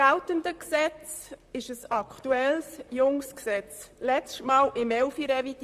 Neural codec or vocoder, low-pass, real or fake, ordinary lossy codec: vocoder, 44.1 kHz, 128 mel bands, Pupu-Vocoder; 14.4 kHz; fake; Opus, 64 kbps